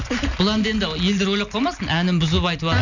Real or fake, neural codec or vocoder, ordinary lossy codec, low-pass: real; none; none; 7.2 kHz